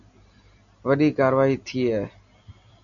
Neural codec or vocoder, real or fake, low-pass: none; real; 7.2 kHz